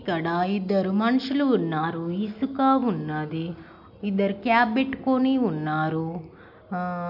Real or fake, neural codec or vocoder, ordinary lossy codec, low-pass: real; none; none; 5.4 kHz